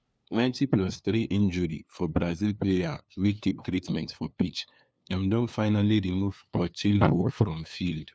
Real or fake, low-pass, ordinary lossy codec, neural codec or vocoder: fake; none; none; codec, 16 kHz, 2 kbps, FunCodec, trained on LibriTTS, 25 frames a second